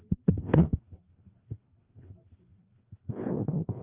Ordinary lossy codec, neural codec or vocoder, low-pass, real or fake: Opus, 32 kbps; codec, 16 kHz, 2 kbps, FreqCodec, larger model; 3.6 kHz; fake